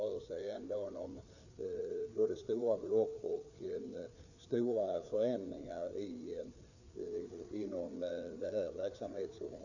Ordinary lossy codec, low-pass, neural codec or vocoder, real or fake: none; 7.2 kHz; codec, 16 kHz, 4 kbps, FreqCodec, larger model; fake